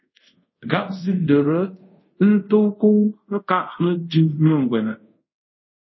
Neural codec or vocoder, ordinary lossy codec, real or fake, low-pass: codec, 24 kHz, 0.5 kbps, DualCodec; MP3, 24 kbps; fake; 7.2 kHz